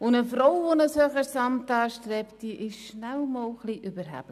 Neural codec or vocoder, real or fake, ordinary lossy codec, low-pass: none; real; none; 14.4 kHz